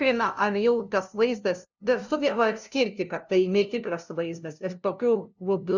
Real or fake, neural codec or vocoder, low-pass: fake; codec, 16 kHz, 0.5 kbps, FunCodec, trained on LibriTTS, 25 frames a second; 7.2 kHz